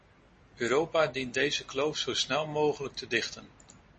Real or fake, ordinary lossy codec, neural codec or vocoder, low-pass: real; MP3, 32 kbps; none; 10.8 kHz